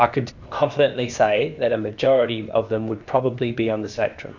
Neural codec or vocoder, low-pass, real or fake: codec, 16 kHz, 0.8 kbps, ZipCodec; 7.2 kHz; fake